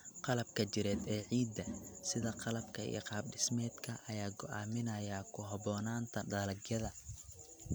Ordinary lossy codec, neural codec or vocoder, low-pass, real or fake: none; none; none; real